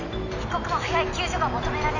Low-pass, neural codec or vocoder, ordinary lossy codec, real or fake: 7.2 kHz; none; none; real